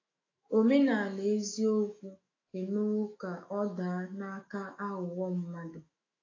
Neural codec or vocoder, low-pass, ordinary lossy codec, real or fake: autoencoder, 48 kHz, 128 numbers a frame, DAC-VAE, trained on Japanese speech; 7.2 kHz; AAC, 32 kbps; fake